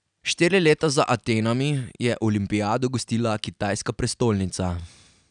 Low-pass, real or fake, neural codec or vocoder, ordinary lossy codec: 9.9 kHz; real; none; none